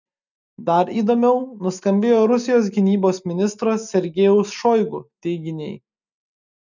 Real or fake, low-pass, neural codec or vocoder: real; 7.2 kHz; none